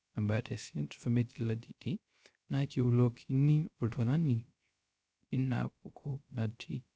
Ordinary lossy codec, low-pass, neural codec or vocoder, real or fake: none; none; codec, 16 kHz, 0.3 kbps, FocalCodec; fake